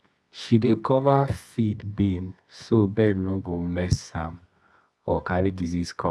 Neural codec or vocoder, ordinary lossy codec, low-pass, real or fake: codec, 24 kHz, 0.9 kbps, WavTokenizer, medium music audio release; none; none; fake